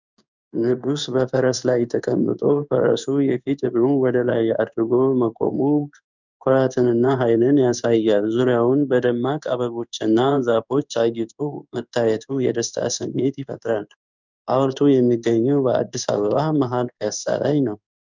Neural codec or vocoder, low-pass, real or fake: codec, 16 kHz in and 24 kHz out, 1 kbps, XY-Tokenizer; 7.2 kHz; fake